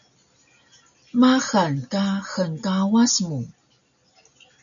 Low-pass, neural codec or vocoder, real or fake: 7.2 kHz; none; real